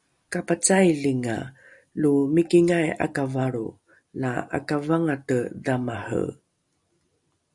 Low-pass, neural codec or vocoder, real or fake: 10.8 kHz; none; real